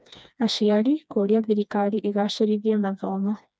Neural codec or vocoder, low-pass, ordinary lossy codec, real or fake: codec, 16 kHz, 2 kbps, FreqCodec, smaller model; none; none; fake